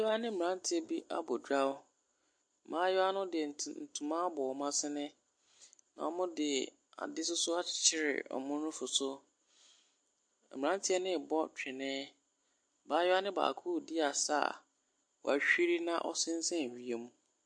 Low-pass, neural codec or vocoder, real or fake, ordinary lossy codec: 9.9 kHz; none; real; MP3, 48 kbps